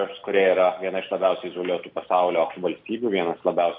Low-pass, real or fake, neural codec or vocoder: 5.4 kHz; real; none